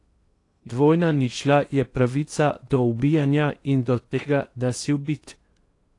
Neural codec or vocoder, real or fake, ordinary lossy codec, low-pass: codec, 16 kHz in and 24 kHz out, 0.8 kbps, FocalCodec, streaming, 65536 codes; fake; AAC, 48 kbps; 10.8 kHz